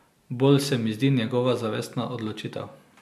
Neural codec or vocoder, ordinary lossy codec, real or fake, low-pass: none; MP3, 96 kbps; real; 14.4 kHz